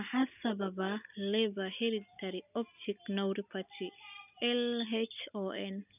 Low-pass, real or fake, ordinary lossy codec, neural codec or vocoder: 3.6 kHz; real; none; none